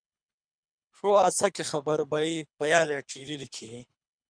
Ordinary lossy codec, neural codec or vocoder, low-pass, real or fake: MP3, 96 kbps; codec, 24 kHz, 3 kbps, HILCodec; 9.9 kHz; fake